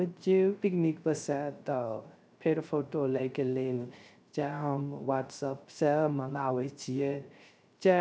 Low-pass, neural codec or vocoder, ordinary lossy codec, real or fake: none; codec, 16 kHz, 0.3 kbps, FocalCodec; none; fake